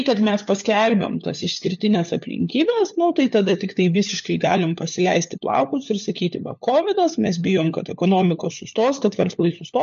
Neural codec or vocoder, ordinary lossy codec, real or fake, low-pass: codec, 16 kHz, 4 kbps, FunCodec, trained on LibriTTS, 50 frames a second; MP3, 48 kbps; fake; 7.2 kHz